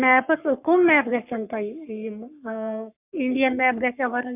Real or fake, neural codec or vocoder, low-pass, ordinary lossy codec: fake; codec, 44.1 kHz, 3.4 kbps, Pupu-Codec; 3.6 kHz; none